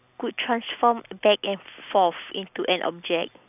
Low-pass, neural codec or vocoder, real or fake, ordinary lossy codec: 3.6 kHz; none; real; none